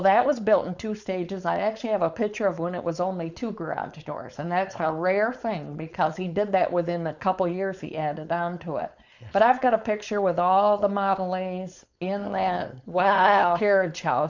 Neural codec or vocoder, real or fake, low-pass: codec, 16 kHz, 4.8 kbps, FACodec; fake; 7.2 kHz